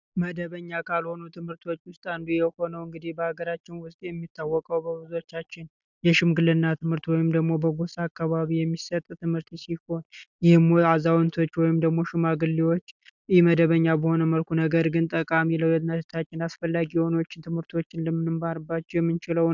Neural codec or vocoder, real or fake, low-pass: none; real; 7.2 kHz